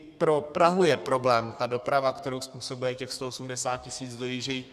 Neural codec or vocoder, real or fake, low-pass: codec, 32 kHz, 1.9 kbps, SNAC; fake; 14.4 kHz